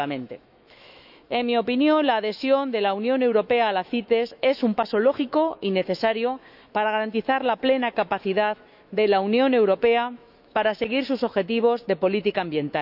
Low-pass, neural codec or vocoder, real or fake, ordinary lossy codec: 5.4 kHz; autoencoder, 48 kHz, 128 numbers a frame, DAC-VAE, trained on Japanese speech; fake; none